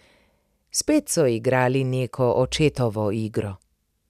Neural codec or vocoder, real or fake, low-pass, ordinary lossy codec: none; real; 14.4 kHz; none